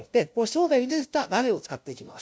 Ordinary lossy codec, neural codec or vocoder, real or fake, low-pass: none; codec, 16 kHz, 0.5 kbps, FunCodec, trained on LibriTTS, 25 frames a second; fake; none